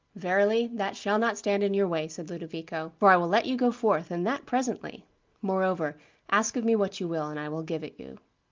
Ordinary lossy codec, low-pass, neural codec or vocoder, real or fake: Opus, 32 kbps; 7.2 kHz; none; real